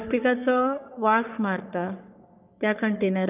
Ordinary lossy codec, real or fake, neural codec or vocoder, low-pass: none; fake; codec, 44.1 kHz, 3.4 kbps, Pupu-Codec; 3.6 kHz